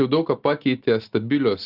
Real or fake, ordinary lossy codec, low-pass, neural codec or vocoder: real; Opus, 32 kbps; 5.4 kHz; none